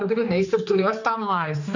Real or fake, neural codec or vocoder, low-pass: fake; codec, 16 kHz, 2 kbps, X-Codec, HuBERT features, trained on general audio; 7.2 kHz